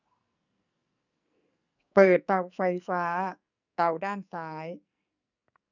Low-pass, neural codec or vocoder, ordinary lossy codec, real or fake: 7.2 kHz; codec, 44.1 kHz, 2.6 kbps, SNAC; none; fake